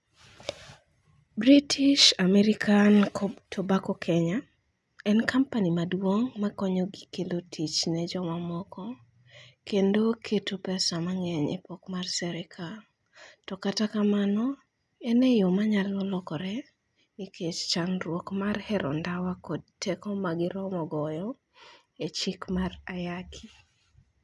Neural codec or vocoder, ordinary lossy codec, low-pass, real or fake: none; none; none; real